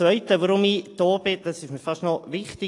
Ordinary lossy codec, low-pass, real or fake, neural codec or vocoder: AAC, 48 kbps; 10.8 kHz; real; none